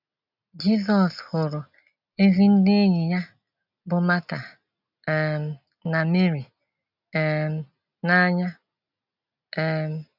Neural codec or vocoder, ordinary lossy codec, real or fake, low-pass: none; none; real; 5.4 kHz